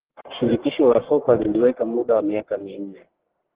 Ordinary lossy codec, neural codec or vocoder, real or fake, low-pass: Opus, 16 kbps; codec, 44.1 kHz, 1.7 kbps, Pupu-Codec; fake; 3.6 kHz